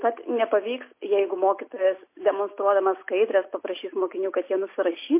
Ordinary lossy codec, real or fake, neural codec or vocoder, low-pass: MP3, 24 kbps; real; none; 3.6 kHz